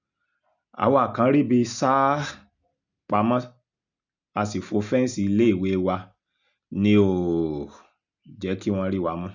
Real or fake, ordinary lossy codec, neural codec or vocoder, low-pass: real; none; none; 7.2 kHz